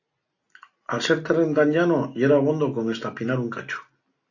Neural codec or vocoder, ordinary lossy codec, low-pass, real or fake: none; AAC, 48 kbps; 7.2 kHz; real